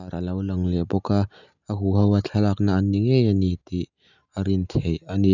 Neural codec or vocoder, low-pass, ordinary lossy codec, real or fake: none; 7.2 kHz; none; real